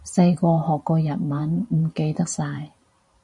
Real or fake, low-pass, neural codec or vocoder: fake; 10.8 kHz; vocoder, 44.1 kHz, 128 mel bands every 512 samples, BigVGAN v2